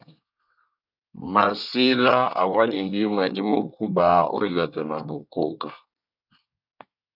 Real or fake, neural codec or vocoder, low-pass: fake; codec, 24 kHz, 1 kbps, SNAC; 5.4 kHz